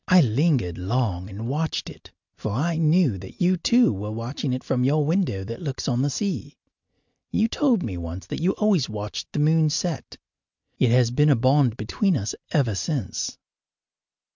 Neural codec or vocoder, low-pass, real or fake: none; 7.2 kHz; real